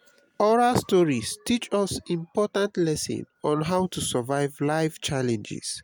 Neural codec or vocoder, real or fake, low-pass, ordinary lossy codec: none; real; none; none